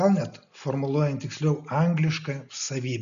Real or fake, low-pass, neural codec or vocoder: real; 7.2 kHz; none